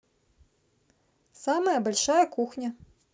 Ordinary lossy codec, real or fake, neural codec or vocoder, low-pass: none; real; none; none